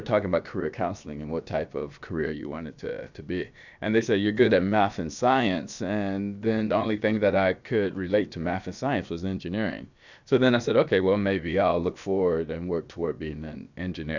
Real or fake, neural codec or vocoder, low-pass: fake; codec, 16 kHz, about 1 kbps, DyCAST, with the encoder's durations; 7.2 kHz